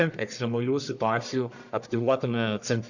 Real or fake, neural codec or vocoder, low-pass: fake; codec, 44.1 kHz, 1.7 kbps, Pupu-Codec; 7.2 kHz